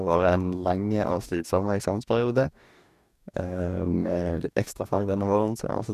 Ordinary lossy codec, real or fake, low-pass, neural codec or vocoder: none; fake; 14.4 kHz; codec, 44.1 kHz, 2.6 kbps, DAC